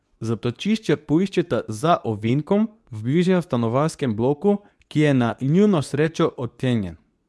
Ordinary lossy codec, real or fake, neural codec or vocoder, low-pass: none; fake; codec, 24 kHz, 0.9 kbps, WavTokenizer, medium speech release version 2; none